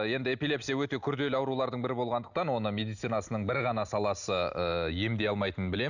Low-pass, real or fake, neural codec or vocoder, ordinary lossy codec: 7.2 kHz; real; none; none